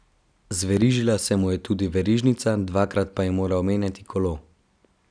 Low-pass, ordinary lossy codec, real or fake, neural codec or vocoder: 9.9 kHz; none; real; none